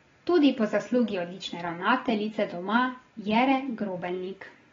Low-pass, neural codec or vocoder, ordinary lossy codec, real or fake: 7.2 kHz; none; AAC, 24 kbps; real